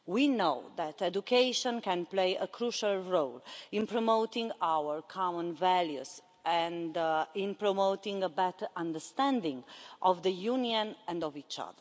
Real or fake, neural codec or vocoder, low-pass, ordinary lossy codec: real; none; none; none